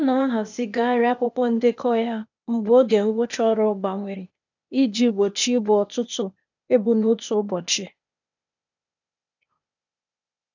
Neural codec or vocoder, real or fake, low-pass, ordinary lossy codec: codec, 16 kHz, 0.8 kbps, ZipCodec; fake; 7.2 kHz; none